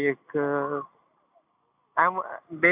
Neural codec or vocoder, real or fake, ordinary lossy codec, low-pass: none; real; AAC, 32 kbps; 3.6 kHz